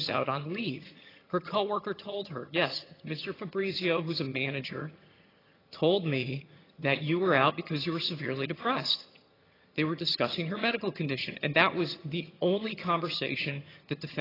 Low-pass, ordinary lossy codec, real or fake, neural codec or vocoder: 5.4 kHz; AAC, 24 kbps; fake; vocoder, 22.05 kHz, 80 mel bands, HiFi-GAN